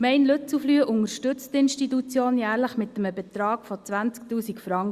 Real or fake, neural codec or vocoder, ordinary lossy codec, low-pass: real; none; Opus, 64 kbps; 14.4 kHz